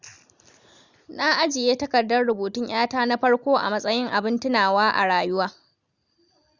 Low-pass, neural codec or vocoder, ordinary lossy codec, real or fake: 7.2 kHz; none; Opus, 64 kbps; real